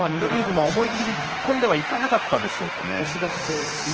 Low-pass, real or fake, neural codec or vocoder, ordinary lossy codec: 7.2 kHz; fake; autoencoder, 48 kHz, 32 numbers a frame, DAC-VAE, trained on Japanese speech; Opus, 16 kbps